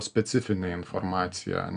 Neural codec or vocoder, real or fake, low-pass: none; real; 9.9 kHz